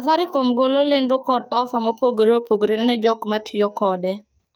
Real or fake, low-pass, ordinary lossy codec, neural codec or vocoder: fake; none; none; codec, 44.1 kHz, 2.6 kbps, SNAC